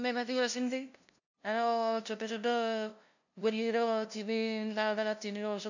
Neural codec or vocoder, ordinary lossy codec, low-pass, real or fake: codec, 16 kHz, 0.5 kbps, FunCodec, trained on LibriTTS, 25 frames a second; none; 7.2 kHz; fake